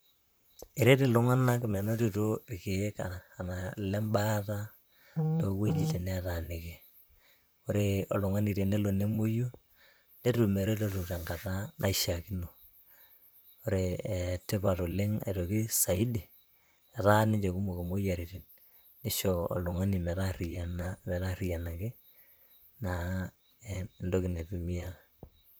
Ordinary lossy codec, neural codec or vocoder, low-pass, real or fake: none; vocoder, 44.1 kHz, 128 mel bands, Pupu-Vocoder; none; fake